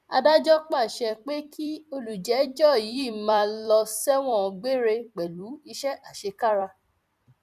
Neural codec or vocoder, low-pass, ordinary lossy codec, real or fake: vocoder, 44.1 kHz, 128 mel bands every 256 samples, BigVGAN v2; 14.4 kHz; none; fake